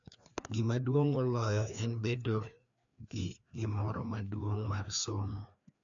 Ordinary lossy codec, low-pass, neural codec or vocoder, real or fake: MP3, 96 kbps; 7.2 kHz; codec, 16 kHz, 2 kbps, FreqCodec, larger model; fake